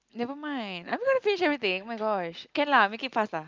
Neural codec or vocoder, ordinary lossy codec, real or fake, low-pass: none; Opus, 24 kbps; real; 7.2 kHz